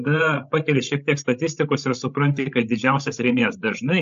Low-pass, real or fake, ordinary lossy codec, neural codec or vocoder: 7.2 kHz; fake; AAC, 64 kbps; codec, 16 kHz, 16 kbps, FreqCodec, larger model